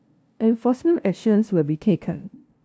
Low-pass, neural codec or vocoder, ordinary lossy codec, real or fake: none; codec, 16 kHz, 0.5 kbps, FunCodec, trained on LibriTTS, 25 frames a second; none; fake